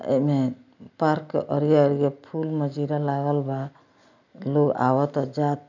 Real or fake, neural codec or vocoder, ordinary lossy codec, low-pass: real; none; none; 7.2 kHz